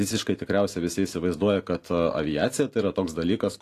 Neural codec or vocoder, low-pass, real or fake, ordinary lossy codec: none; 14.4 kHz; real; AAC, 48 kbps